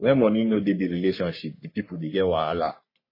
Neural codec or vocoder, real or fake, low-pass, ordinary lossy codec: codec, 44.1 kHz, 3.4 kbps, Pupu-Codec; fake; 5.4 kHz; MP3, 24 kbps